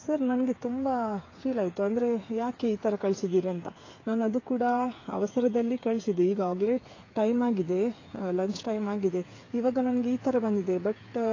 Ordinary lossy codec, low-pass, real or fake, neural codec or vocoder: AAC, 32 kbps; 7.2 kHz; fake; codec, 16 kHz, 8 kbps, FreqCodec, smaller model